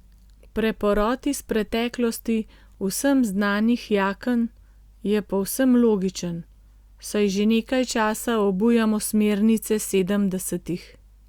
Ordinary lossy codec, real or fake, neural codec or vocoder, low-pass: Opus, 64 kbps; real; none; 19.8 kHz